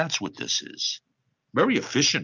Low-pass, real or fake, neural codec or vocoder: 7.2 kHz; real; none